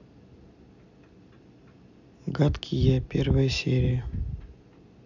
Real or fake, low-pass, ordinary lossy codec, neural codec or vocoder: real; 7.2 kHz; none; none